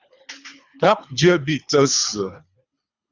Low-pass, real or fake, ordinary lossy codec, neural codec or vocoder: 7.2 kHz; fake; Opus, 64 kbps; codec, 24 kHz, 3 kbps, HILCodec